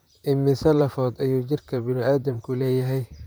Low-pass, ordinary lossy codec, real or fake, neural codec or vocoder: none; none; fake; vocoder, 44.1 kHz, 128 mel bands, Pupu-Vocoder